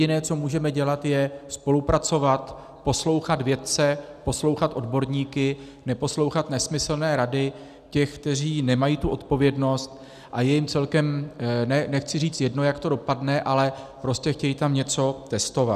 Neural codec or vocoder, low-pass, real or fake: none; 14.4 kHz; real